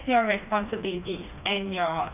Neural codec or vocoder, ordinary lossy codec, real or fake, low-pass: codec, 16 kHz, 2 kbps, FreqCodec, smaller model; none; fake; 3.6 kHz